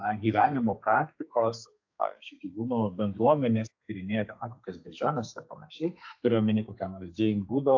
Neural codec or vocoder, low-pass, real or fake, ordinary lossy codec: codec, 32 kHz, 1.9 kbps, SNAC; 7.2 kHz; fake; AAC, 48 kbps